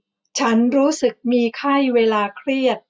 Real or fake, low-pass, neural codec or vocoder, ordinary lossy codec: real; none; none; none